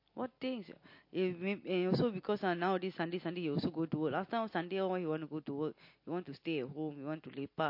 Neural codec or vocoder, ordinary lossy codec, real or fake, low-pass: none; MP3, 32 kbps; real; 5.4 kHz